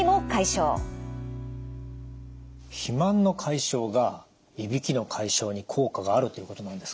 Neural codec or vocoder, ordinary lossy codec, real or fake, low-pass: none; none; real; none